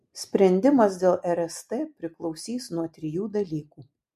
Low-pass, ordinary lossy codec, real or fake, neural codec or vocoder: 14.4 kHz; MP3, 64 kbps; real; none